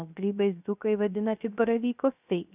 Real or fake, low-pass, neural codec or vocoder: fake; 3.6 kHz; codec, 16 kHz, 0.3 kbps, FocalCodec